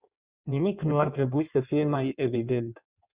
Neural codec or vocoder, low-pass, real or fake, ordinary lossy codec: codec, 16 kHz in and 24 kHz out, 1.1 kbps, FireRedTTS-2 codec; 3.6 kHz; fake; Opus, 64 kbps